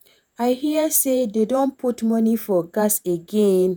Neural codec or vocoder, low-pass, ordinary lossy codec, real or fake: vocoder, 48 kHz, 128 mel bands, Vocos; none; none; fake